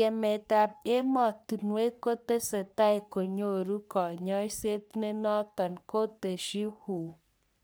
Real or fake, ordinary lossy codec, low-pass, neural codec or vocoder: fake; none; none; codec, 44.1 kHz, 3.4 kbps, Pupu-Codec